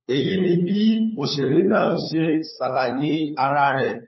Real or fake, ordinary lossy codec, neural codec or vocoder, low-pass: fake; MP3, 24 kbps; codec, 16 kHz, 4 kbps, FunCodec, trained on LibriTTS, 50 frames a second; 7.2 kHz